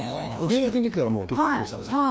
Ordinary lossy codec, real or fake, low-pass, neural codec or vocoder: none; fake; none; codec, 16 kHz, 1 kbps, FreqCodec, larger model